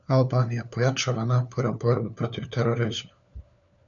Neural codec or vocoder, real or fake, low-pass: codec, 16 kHz, 4 kbps, FunCodec, trained on LibriTTS, 50 frames a second; fake; 7.2 kHz